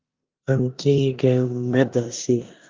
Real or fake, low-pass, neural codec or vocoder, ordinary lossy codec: fake; 7.2 kHz; codec, 16 kHz in and 24 kHz out, 0.9 kbps, LongCat-Audio-Codec, four codebook decoder; Opus, 24 kbps